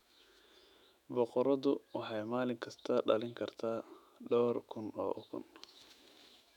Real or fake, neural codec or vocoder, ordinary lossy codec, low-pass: fake; autoencoder, 48 kHz, 128 numbers a frame, DAC-VAE, trained on Japanese speech; none; 19.8 kHz